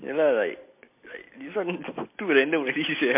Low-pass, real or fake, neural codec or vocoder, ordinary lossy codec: 3.6 kHz; real; none; MP3, 24 kbps